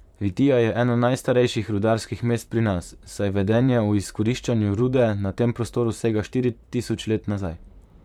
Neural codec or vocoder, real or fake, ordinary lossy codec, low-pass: vocoder, 44.1 kHz, 128 mel bands, Pupu-Vocoder; fake; none; 19.8 kHz